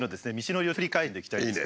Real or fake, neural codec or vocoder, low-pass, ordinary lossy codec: real; none; none; none